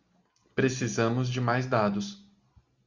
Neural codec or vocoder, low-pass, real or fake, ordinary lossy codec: none; 7.2 kHz; real; Opus, 64 kbps